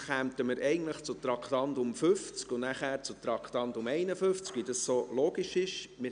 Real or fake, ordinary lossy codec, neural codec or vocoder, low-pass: real; none; none; 9.9 kHz